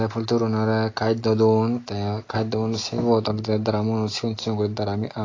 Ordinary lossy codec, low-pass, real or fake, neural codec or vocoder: AAC, 32 kbps; 7.2 kHz; real; none